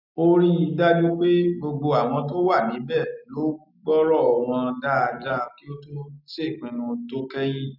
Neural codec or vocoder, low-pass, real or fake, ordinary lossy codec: none; 5.4 kHz; real; none